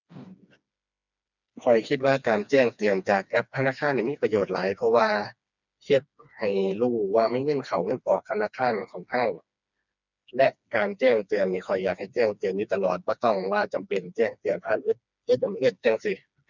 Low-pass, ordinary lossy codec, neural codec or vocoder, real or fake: 7.2 kHz; none; codec, 16 kHz, 2 kbps, FreqCodec, smaller model; fake